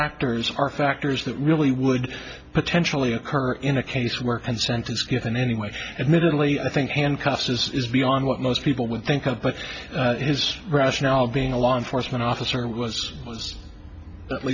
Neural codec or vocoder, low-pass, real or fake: none; 7.2 kHz; real